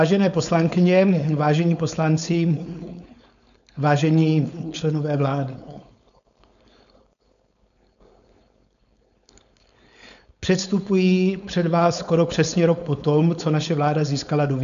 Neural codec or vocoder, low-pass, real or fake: codec, 16 kHz, 4.8 kbps, FACodec; 7.2 kHz; fake